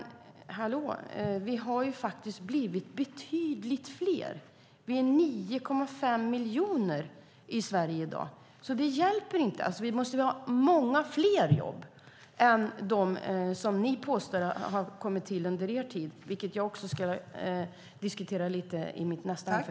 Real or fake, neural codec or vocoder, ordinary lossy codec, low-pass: real; none; none; none